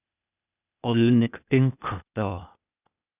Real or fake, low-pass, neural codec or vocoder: fake; 3.6 kHz; codec, 16 kHz, 0.8 kbps, ZipCodec